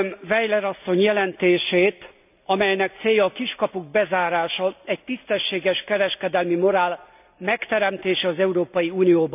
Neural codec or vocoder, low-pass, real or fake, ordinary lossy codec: none; 3.6 kHz; real; none